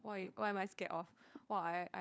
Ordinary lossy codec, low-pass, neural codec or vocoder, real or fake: none; none; codec, 16 kHz, 4 kbps, FunCodec, trained on LibriTTS, 50 frames a second; fake